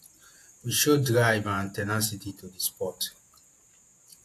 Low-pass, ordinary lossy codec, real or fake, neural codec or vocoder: 14.4 kHz; AAC, 64 kbps; fake; vocoder, 48 kHz, 128 mel bands, Vocos